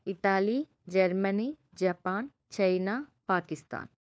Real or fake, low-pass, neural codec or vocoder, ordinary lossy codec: fake; none; codec, 16 kHz, 4 kbps, FunCodec, trained on LibriTTS, 50 frames a second; none